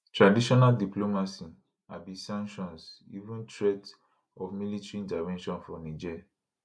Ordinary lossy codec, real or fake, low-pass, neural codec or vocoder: none; real; none; none